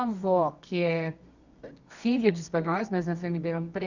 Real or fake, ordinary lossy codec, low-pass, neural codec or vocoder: fake; none; 7.2 kHz; codec, 24 kHz, 0.9 kbps, WavTokenizer, medium music audio release